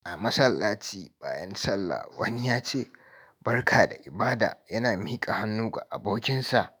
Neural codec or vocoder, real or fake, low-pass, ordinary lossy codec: autoencoder, 48 kHz, 128 numbers a frame, DAC-VAE, trained on Japanese speech; fake; none; none